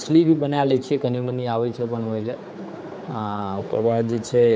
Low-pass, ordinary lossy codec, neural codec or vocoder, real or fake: none; none; codec, 16 kHz, 4 kbps, X-Codec, HuBERT features, trained on general audio; fake